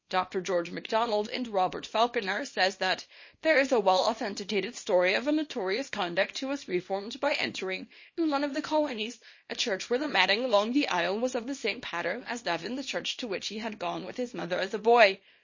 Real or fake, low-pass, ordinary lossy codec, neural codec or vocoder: fake; 7.2 kHz; MP3, 32 kbps; codec, 24 kHz, 0.9 kbps, WavTokenizer, small release